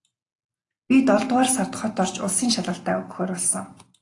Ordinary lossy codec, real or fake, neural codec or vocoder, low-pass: AAC, 48 kbps; real; none; 10.8 kHz